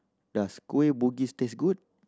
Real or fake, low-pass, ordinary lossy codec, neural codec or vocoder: real; none; none; none